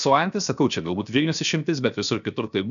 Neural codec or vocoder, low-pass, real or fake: codec, 16 kHz, 0.7 kbps, FocalCodec; 7.2 kHz; fake